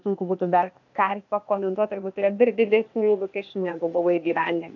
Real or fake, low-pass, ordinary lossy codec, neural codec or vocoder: fake; 7.2 kHz; AAC, 48 kbps; codec, 16 kHz, 0.8 kbps, ZipCodec